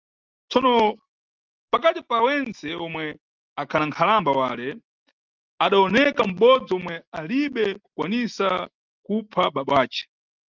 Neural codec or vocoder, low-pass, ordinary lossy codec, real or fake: none; 7.2 kHz; Opus, 32 kbps; real